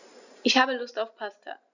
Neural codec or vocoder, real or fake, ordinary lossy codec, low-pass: none; real; none; 7.2 kHz